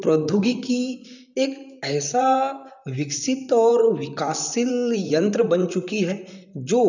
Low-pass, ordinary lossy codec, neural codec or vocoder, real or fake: 7.2 kHz; none; vocoder, 44.1 kHz, 128 mel bands, Pupu-Vocoder; fake